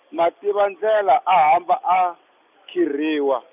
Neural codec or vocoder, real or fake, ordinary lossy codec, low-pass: none; real; none; 3.6 kHz